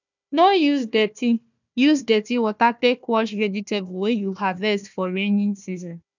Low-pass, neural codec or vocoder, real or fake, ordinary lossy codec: 7.2 kHz; codec, 16 kHz, 1 kbps, FunCodec, trained on Chinese and English, 50 frames a second; fake; none